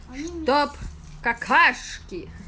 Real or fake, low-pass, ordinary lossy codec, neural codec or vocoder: real; none; none; none